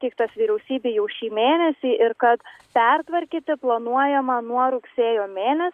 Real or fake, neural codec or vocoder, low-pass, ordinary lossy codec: real; none; 14.4 kHz; Opus, 64 kbps